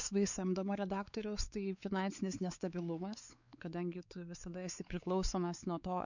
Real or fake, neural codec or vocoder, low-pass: fake; codec, 16 kHz, 4 kbps, X-Codec, WavLM features, trained on Multilingual LibriSpeech; 7.2 kHz